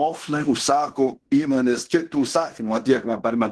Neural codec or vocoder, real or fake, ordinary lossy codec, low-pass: codec, 16 kHz in and 24 kHz out, 0.9 kbps, LongCat-Audio-Codec, fine tuned four codebook decoder; fake; Opus, 16 kbps; 10.8 kHz